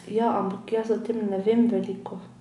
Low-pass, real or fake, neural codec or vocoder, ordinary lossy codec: 10.8 kHz; real; none; none